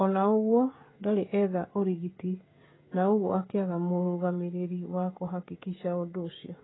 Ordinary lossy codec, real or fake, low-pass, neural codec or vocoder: AAC, 16 kbps; fake; 7.2 kHz; codec, 16 kHz, 16 kbps, FreqCodec, smaller model